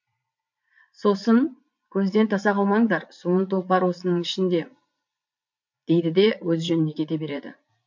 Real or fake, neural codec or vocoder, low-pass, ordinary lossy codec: fake; vocoder, 44.1 kHz, 80 mel bands, Vocos; 7.2 kHz; MP3, 48 kbps